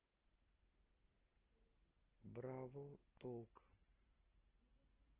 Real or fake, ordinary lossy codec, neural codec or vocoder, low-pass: real; Opus, 16 kbps; none; 3.6 kHz